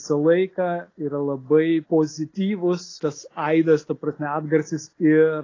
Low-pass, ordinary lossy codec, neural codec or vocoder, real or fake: 7.2 kHz; AAC, 32 kbps; none; real